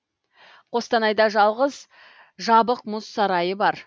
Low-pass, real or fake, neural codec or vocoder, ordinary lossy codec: none; real; none; none